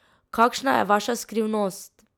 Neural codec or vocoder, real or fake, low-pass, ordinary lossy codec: none; real; 19.8 kHz; none